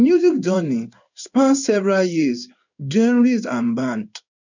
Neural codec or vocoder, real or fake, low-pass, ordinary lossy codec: codec, 16 kHz in and 24 kHz out, 1 kbps, XY-Tokenizer; fake; 7.2 kHz; none